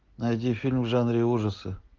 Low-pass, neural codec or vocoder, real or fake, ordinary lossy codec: 7.2 kHz; none; real; Opus, 24 kbps